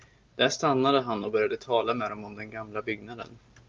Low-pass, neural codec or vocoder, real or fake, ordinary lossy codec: 7.2 kHz; none; real; Opus, 24 kbps